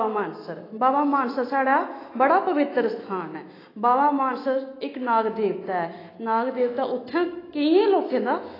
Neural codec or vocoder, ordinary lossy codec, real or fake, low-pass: autoencoder, 48 kHz, 128 numbers a frame, DAC-VAE, trained on Japanese speech; AAC, 24 kbps; fake; 5.4 kHz